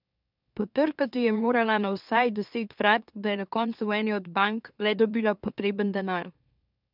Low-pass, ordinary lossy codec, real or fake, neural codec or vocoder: 5.4 kHz; none; fake; autoencoder, 44.1 kHz, a latent of 192 numbers a frame, MeloTTS